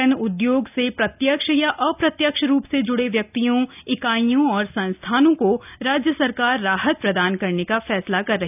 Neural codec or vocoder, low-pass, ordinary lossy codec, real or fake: none; 3.6 kHz; none; real